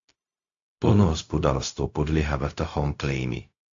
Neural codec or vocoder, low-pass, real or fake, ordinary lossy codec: codec, 16 kHz, 0.4 kbps, LongCat-Audio-Codec; 7.2 kHz; fake; AAC, 48 kbps